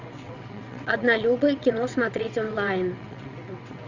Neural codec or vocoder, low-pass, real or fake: vocoder, 22.05 kHz, 80 mel bands, WaveNeXt; 7.2 kHz; fake